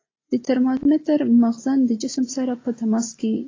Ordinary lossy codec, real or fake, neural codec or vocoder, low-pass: AAC, 32 kbps; real; none; 7.2 kHz